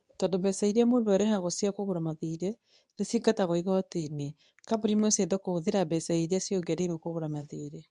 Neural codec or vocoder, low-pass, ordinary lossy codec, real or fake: codec, 24 kHz, 0.9 kbps, WavTokenizer, medium speech release version 2; 10.8 kHz; none; fake